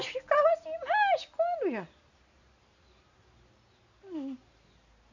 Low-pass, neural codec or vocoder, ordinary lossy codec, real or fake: 7.2 kHz; none; none; real